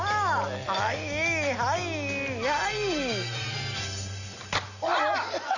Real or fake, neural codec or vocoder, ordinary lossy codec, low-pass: real; none; none; 7.2 kHz